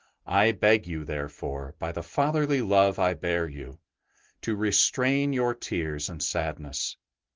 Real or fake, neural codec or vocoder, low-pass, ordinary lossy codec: real; none; 7.2 kHz; Opus, 16 kbps